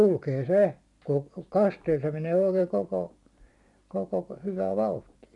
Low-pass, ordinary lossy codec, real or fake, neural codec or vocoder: 10.8 kHz; none; fake; vocoder, 44.1 kHz, 128 mel bands every 512 samples, BigVGAN v2